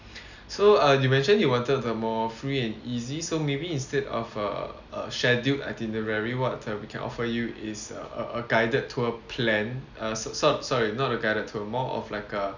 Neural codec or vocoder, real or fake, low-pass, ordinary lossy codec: none; real; 7.2 kHz; none